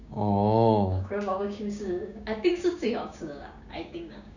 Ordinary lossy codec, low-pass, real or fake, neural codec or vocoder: none; 7.2 kHz; fake; codec, 16 kHz, 6 kbps, DAC